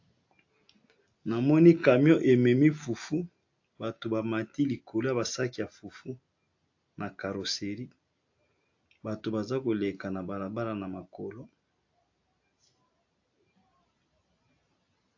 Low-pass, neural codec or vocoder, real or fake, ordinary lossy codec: 7.2 kHz; none; real; AAC, 48 kbps